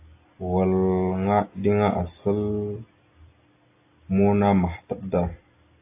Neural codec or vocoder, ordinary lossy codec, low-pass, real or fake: none; AAC, 24 kbps; 3.6 kHz; real